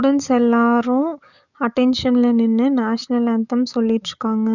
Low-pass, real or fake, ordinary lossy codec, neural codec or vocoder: 7.2 kHz; fake; none; codec, 44.1 kHz, 7.8 kbps, DAC